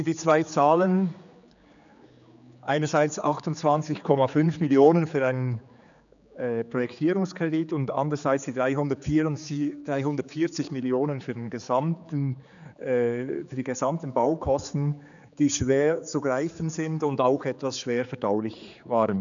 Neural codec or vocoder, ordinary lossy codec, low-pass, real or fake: codec, 16 kHz, 4 kbps, X-Codec, HuBERT features, trained on general audio; none; 7.2 kHz; fake